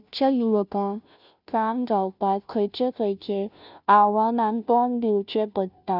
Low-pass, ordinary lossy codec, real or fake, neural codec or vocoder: 5.4 kHz; none; fake; codec, 16 kHz, 0.5 kbps, FunCodec, trained on Chinese and English, 25 frames a second